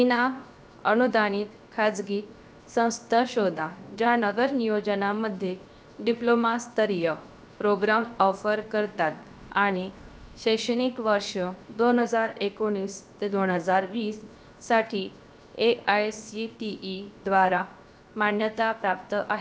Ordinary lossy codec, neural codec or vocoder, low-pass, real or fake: none; codec, 16 kHz, 0.7 kbps, FocalCodec; none; fake